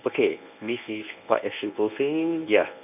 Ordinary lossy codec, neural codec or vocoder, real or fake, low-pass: none; codec, 24 kHz, 0.9 kbps, WavTokenizer, medium speech release version 1; fake; 3.6 kHz